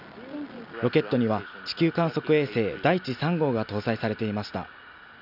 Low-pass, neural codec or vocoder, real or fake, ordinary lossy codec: 5.4 kHz; none; real; none